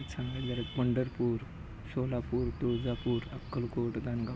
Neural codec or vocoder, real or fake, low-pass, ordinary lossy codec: none; real; none; none